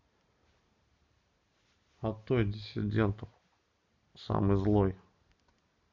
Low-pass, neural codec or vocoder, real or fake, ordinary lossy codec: 7.2 kHz; none; real; MP3, 64 kbps